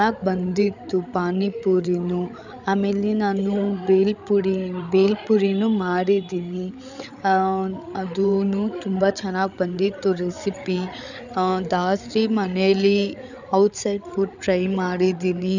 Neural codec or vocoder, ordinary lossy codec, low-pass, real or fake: codec, 16 kHz, 8 kbps, FreqCodec, larger model; none; 7.2 kHz; fake